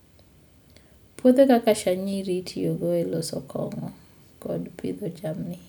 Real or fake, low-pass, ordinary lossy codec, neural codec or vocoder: real; none; none; none